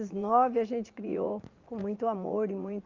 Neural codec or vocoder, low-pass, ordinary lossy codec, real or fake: vocoder, 44.1 kHz, 80 mel bands, Vocos; 7.2 kHz; Opus, 24 kbps; fake